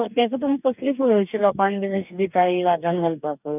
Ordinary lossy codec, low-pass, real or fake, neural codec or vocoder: none; 3.6 kHz; fake; codec, 44.1 kHz, 2.6 kbps, DAC